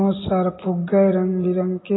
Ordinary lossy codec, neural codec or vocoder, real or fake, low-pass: AAC, 16 kbps; none; real; 7.2 kHz